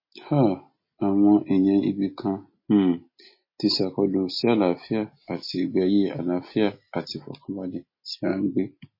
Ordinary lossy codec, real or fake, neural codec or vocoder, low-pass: MP3, 24 kbps; real; none; 5.4 kHz